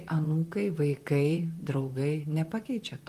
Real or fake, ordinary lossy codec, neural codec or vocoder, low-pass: fake; Opus, 32 kbps; vocoder, 44.1 kHz, 128 mel bands, Pupu-Vocoder; 14.4 kHz